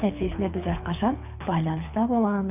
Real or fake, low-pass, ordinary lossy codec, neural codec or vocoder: fake; 3.6 kHz; none; codec, 24 kHz, 6 kbps, HILCodec